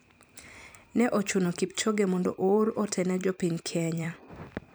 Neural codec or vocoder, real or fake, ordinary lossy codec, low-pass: vocoder, 44.1 kHz, 128 mel bands every 256 samples, BigVGAN v2; fake; none; none